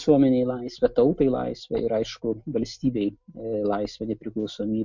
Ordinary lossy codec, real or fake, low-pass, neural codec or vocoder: MP3, 64 kbps; real; 7.2 kHz; none